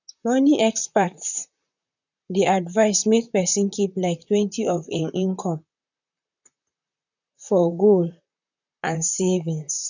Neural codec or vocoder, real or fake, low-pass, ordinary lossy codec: vocoder, 44.1 kHz, 128 mel bands, Pupu-Vocoder; fake; 7.2 kHz; none